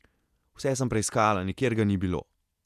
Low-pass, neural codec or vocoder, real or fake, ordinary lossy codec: 14.4 kHz; vocoder, 48 kHz, 128 mel bands, Vocos; fake; none